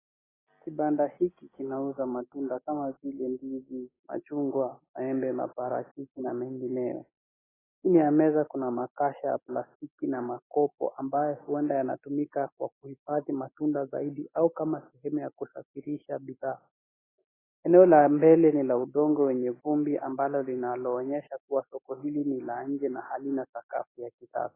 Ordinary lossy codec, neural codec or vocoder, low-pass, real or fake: AAC, 16 kbps; none; 3.6 kHz; real